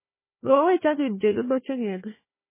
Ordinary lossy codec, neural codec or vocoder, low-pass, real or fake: MP3, 16 kbps; codec, 16 kHz, 1 kbps, FunCodec, trained on Chinese and English, 50 frames a second; 3.6 kHz; fake